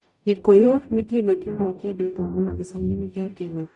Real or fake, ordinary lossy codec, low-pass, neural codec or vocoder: fake; none; 10.8 kHz; codec, 44.1 kHz, 0.9 kbps, DAC